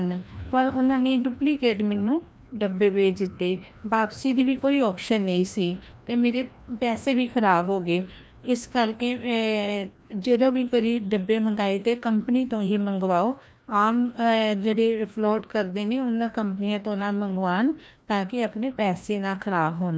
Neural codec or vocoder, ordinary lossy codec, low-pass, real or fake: codec, 16 kHz, 1 kbps, FreqCodec, larger model; none; none; fake